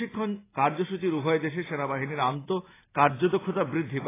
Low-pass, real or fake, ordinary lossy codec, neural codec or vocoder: 3.6 kHz; real; AAC, 16 kbps; none